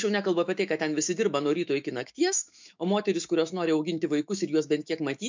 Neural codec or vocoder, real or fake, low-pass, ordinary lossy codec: autoencoder, 48 kHz, 128 numbers a frame, DAC-VAE, trained on Japanese speech; fake; 7.2 kHz; MP3, 64 kbps